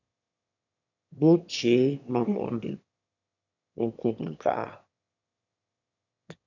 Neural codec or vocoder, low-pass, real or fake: autoencoder, 22.05 kHz, a latent of 192 numbers a frame, VITS, trained on one speaker; 7.2 kHz; fake